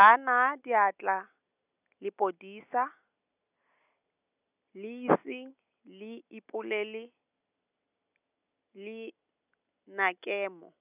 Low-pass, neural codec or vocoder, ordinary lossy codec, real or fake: 3.6 kHz; none; none; real